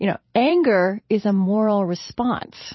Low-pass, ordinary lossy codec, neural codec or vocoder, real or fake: 7.2 kHz; MP3, 24 kbps; vocoder, 44.1 kHz, 128 mel bands every 512 samples, BigVGAN v2; fake